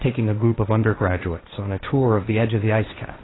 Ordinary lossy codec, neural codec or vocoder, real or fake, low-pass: AAC, 16 kbps; codec, 16 kHz, 1.1 kbps, Voila-Tokenizer; fake; 7.2 kHz